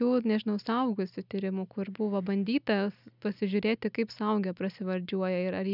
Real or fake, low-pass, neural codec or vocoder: real; 5.4 kHz; none